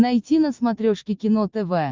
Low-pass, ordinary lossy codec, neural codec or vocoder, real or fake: 7.2 kHz; Opus, 32 kbps; none; real